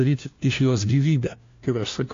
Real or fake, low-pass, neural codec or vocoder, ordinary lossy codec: fake; 7.2 kHz; codec, 16 kHz, 1 kbps, FunCodec, trained on LibriTTS, 50 frames a second; AAC, 48 kbps